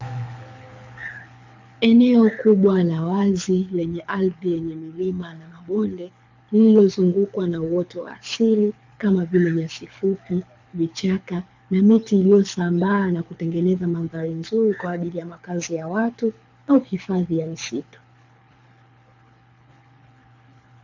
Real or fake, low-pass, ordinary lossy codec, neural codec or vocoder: fake; 7.2 kHz; MP3, 64 kbps; codec, 24 kHz, 6 kbps, HILCodec